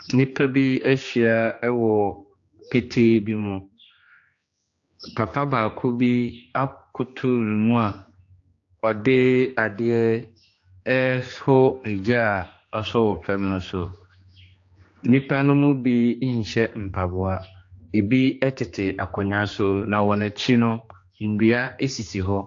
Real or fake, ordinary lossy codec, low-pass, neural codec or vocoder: fake; AAC, 48 kbps; 7.2 kHz; codec, 16 kHz, 2 kbps, X-Codec, HuBERT features, trained on general audio